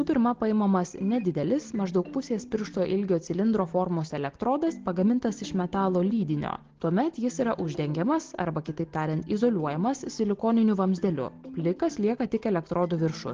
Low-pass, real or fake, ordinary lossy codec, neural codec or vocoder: 7.2 kHz; real; Opus, 16 kbps; none